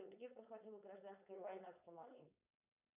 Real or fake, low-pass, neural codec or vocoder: fake; 3.6 kHz; codec, 16 kHz, 4.8 kbps, FACodec